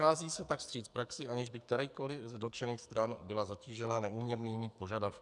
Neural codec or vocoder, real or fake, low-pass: codec, 44.1 kHz, 2.6 kbps, SNAC; fake; 10.8 kHz